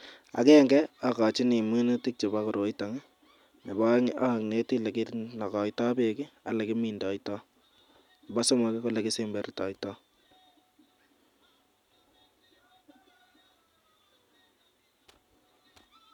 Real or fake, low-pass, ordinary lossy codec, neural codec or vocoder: real; 19.8 kHz; none; none